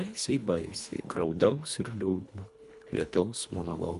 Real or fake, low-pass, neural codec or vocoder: fake; 10.8 kHz; codec, 24 kHz, 1.5 kbps, HILCodec